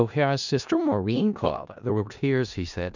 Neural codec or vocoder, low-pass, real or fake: codec, 16 kHz in and 24 kHz out, 0.4 kbps, LongCat-Audio-Codec, four codebook decoder; 7.2 kHz; fake